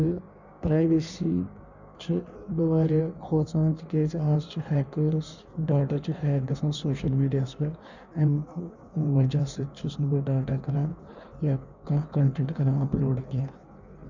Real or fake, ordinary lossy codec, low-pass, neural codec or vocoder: fake; none; 7.2 kHz; codec, 16 kHz in and 24 kHz out, 1.1 kbps, FireRedTTS-2 codec